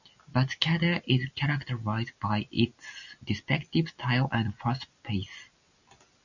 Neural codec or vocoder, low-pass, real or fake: none; 7.2 kHz; real